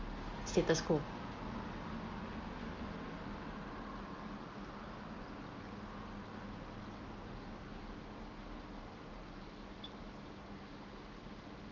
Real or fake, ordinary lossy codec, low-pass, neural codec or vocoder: real; Opus, 32 kbps; 7.2 kHz; none